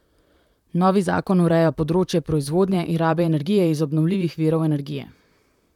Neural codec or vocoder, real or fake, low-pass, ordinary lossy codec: vocoder, 44.1 kHz, 128 mel bands, Pupu-Vocoder; fake; 19.8 kHz; none